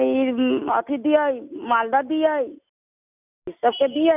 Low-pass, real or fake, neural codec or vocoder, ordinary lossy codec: 3.6 kHz; real; none; none